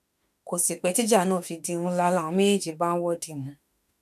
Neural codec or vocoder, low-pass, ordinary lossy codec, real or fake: autoencoder, 48 kHz, 32 numbers a frame, DAC-VAE, trained on Japanese speech; 14.4 kHz; none; fake